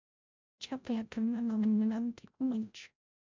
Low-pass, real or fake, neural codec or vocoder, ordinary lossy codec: 7.2 kHz; fake; codec, 16 kHz, 0.5 kbps, FreqCodec, larger model; MP3, 64 kbps